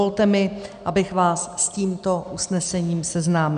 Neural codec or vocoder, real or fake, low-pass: none; real; 9.9 kHz